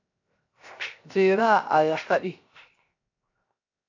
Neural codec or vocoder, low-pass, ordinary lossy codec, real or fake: codec, 16 kHz, 0.7 kbps, FocalCodec; 7.2 kHz; AAC, 48 kbps; fake